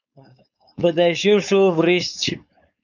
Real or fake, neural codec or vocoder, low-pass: fake; codec, 16 kHz, 4.8 kbps, FACodec; 7.2 kHz